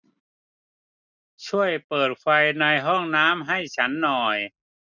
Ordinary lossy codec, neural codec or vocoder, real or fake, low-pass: none; none; real; 7.2 kHz